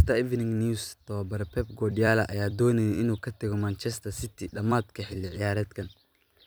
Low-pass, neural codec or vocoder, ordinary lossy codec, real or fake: none; vocoder, 44.1 kHz, 128 mel bands every 256 samples, BigVGAN v2; none; fake